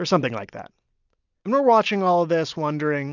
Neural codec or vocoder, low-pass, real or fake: none; 7.2 kHz; real